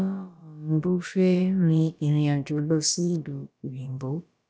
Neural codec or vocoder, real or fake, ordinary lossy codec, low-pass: codec, 16 kHz, about 1 kbps, DyCAST, with the encoder's durations; fake; none; none